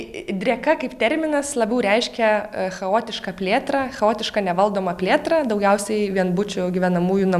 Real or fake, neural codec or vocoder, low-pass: real; none; 14.4 kHz